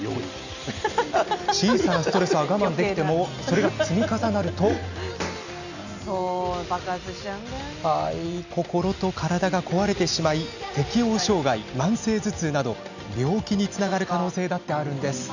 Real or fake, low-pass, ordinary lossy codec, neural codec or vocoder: real; 7.2 kHz; none; none